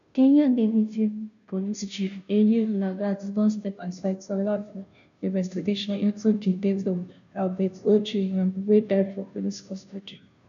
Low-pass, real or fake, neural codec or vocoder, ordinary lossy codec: 7.2 kHz; fake; codec, 16 kHz, 0.5 kbps, FunCodec, trained on Chinese and English, 25 frames a second; none